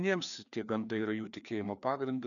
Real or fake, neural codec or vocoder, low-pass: fake; codec, 16 kHz, 2 kbps, FreqCodec, larger model; 7.2 kHz